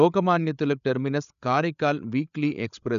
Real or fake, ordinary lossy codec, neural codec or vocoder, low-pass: fake; none; codec, 16 kHz, 8 kbps, FreqCodec, larger model; 7.2 kHz